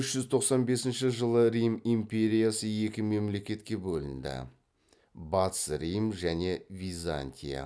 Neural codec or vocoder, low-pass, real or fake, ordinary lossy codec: none; none; real; none